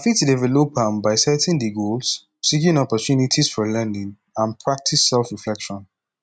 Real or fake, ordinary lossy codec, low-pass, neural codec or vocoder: real; none; 9.9 kHz; none